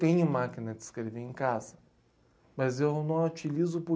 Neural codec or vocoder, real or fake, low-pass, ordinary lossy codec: none; real; none; none